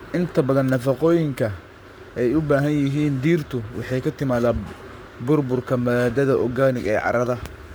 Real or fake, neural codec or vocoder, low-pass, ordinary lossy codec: fake; codec, 44.1 kHz, 7.8 kbps, Pupu-Codec; none; none